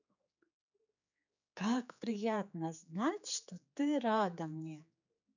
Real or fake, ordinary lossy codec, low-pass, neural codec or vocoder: fake; none; 7.2 kHz; codec, 16 kHz, 4 kbps, X-Codec, HuBERT features, trained on general audio